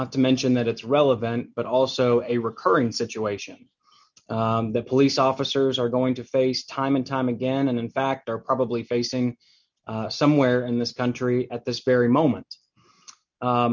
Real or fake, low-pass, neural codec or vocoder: real; 7.2 kHz; none